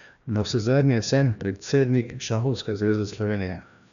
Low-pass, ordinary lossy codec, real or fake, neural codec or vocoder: 7.2 kHz; none; fake; codec, 16 kHz, 1 kbps, FreqCodec, larger model